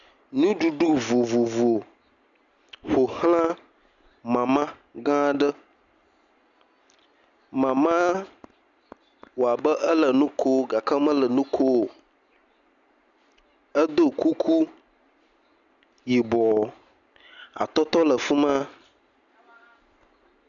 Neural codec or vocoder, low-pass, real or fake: none; 7.2 kHz; real